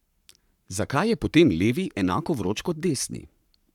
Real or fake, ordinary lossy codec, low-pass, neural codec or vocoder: fake; none; 19.8 kHz; codec, 44.1 kHz, 7.8 kbps, Pupu-Codec